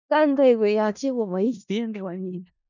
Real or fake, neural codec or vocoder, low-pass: fake; codec, 16 kHz in and 24 kHz out, 0.4 kbps, LongCat-Audio-Codec, four codebook decoder; 7.2 kHz